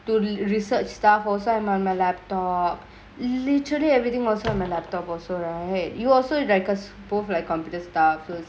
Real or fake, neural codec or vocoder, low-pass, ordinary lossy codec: real; none; none; none